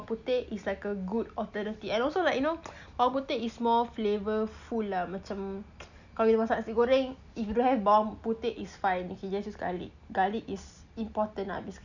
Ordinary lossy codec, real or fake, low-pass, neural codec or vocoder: none; real; 7.2 kHz; none